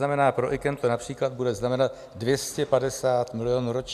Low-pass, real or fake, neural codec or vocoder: 14.4 kHz; real; none